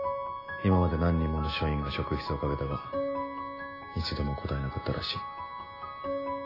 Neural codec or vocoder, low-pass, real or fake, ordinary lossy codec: none; 5.4 kHz; real; AAC, 24 kbps